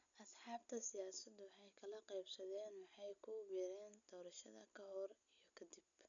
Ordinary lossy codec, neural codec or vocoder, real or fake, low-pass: none; none; real; 7.2 kHz